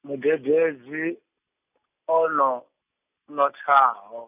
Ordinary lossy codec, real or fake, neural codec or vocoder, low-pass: none; real; none; 3.6 kHz